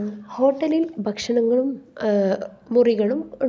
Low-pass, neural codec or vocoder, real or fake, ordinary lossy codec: none; none; real; none